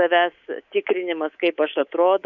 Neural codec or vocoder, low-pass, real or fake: codec, 24 kHz, 3.1 kbps, DualCodec; 7.2 kHz; fake